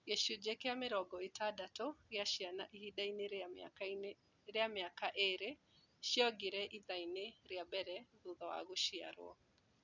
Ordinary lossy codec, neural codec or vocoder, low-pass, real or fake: none; none; 7.2 kHz; real